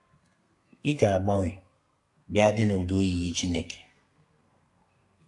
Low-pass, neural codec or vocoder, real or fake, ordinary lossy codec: 10.8 kHz; codec, 32 kHz, 1.9 kbps, SNAC; fake; AAC, 48 kbps